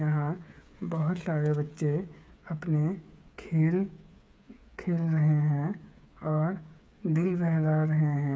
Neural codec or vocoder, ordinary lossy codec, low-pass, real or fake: codec, 16 kHz, 8 kbps, FreqCodec, smaller model; none; none; fake